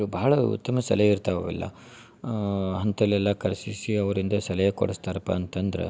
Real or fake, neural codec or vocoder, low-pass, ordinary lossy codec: real; none; none; none